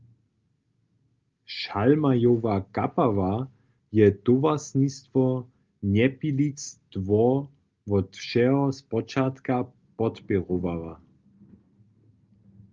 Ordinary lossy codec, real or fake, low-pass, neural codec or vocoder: Opus, 32 kbps; real; 7.2 kHz; none